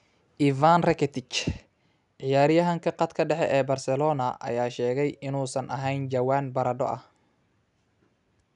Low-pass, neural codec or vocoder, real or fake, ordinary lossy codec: 10.8 kHz; none; real; none